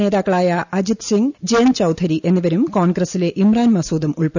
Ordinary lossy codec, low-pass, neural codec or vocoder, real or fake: none; 7.2 kHz; none; real